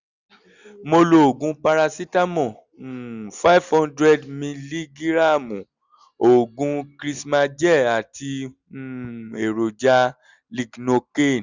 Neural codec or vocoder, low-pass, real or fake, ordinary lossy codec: none; 7.2 kHz; real; Opus, 64 kbps